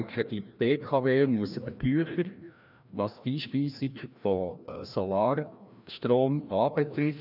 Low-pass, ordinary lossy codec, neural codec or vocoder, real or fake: 5.4 kHz; none; codec, 16 kHz, 1 kbps, FreqCodec, larger model; fake